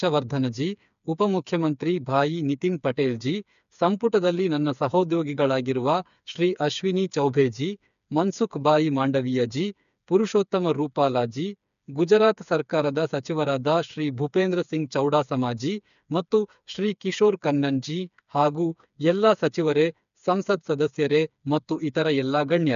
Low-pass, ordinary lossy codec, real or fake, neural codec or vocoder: 7.2 kHz; none; fake; codec, 16 kHz, 4 kbps, FreqCodec, smaller model